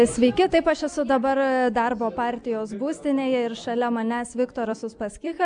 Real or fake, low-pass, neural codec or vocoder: real; 9.9 kHz; none